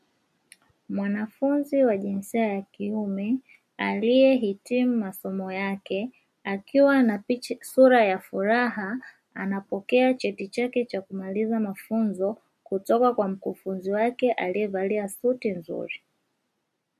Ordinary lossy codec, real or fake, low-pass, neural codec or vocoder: MP3, 64 kbps; real; 14.4 kHz; none